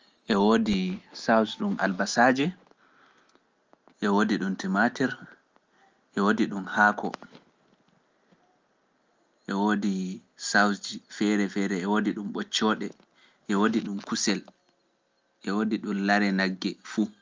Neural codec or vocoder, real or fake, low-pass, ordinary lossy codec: none; real; 7.2 kHz; Opus, 24 kbps